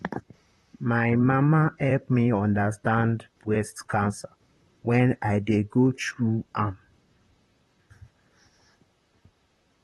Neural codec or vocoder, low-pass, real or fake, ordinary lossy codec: vocoder, 44.1 kHz, 128 mel bands, Pupu-Vocoder; 19.8 kHz; fake; AAC, 32 kbps